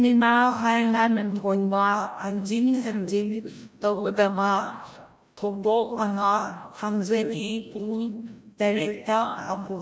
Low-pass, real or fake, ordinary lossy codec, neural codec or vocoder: none; fake; none; codec, 16 kHz, 0.5 kbps, FreqCodec, larger model